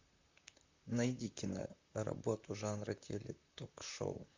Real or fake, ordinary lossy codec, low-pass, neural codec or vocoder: fake; MP3, 64 kbps; 7.2 kHz; vocoder, 44.1 kHz, 128 mel bands, Pupu-Vocoder